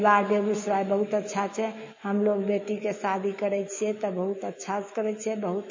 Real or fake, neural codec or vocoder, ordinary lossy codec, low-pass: fake; autoencoder, 48 kHz, 128 numbers a frame, DAC-VAE, trained on Japanese speech; MP3, 32 kbps; 7.2 kHz